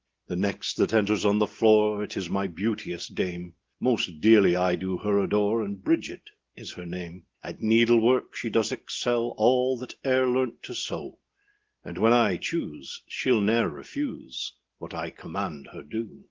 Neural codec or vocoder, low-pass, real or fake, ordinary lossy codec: none; 7.2 kHz; real; Opus, 16 kbps